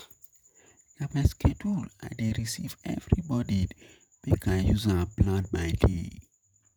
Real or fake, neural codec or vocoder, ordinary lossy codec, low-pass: fake; vocoder, 48 kHz, 128 mel bands, Vocos; none; none